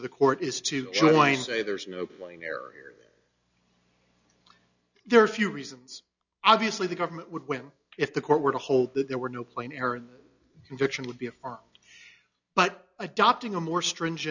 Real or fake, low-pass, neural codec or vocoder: real; 7.2 kHz; none